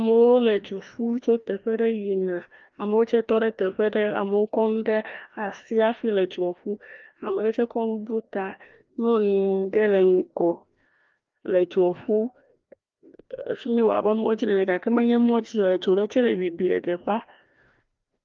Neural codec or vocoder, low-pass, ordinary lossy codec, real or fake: codec, 16 kHz, 1 kbps, FreqCodec, larger model; 7.2 kHz; Opus, 32 kbps; fake